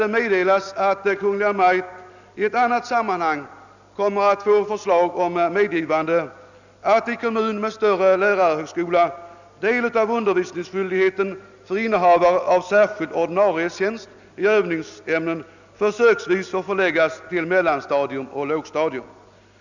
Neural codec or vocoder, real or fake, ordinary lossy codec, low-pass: none; real; none; 7.2 kHz